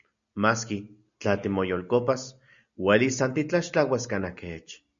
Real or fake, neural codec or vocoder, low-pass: real; none; 7.2 kHz